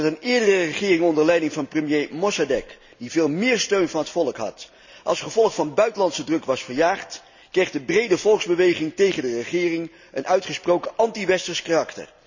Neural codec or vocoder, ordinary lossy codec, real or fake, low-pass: none; none; real; 7.2 kHz